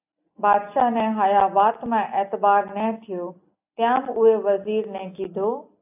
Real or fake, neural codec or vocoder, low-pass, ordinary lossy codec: real; none; 3.6 kHz; AAC, 32 kbps